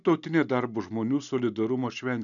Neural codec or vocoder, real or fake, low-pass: none; real; 7.2 kHz